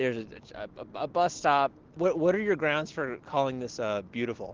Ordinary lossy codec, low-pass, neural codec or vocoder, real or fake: Opus, 16 kbps; 7.2 kHz; none; real